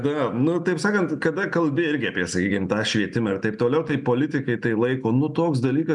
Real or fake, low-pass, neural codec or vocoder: real; 10.8 kHz; none